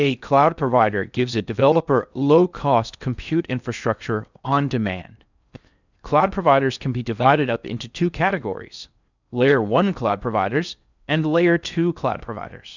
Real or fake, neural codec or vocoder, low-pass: fake; codec, 16 kHz in and 24 kHz out, 0.6 kbps, FocalCodec, streaming, 4096 codes; 7.2 kHz